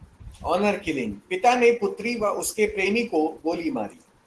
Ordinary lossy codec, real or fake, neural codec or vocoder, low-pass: Opus, 16 kbps; real; none; 10.8 kHz